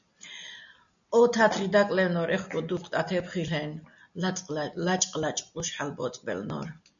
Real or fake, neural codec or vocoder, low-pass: real; none; 7.2 kHz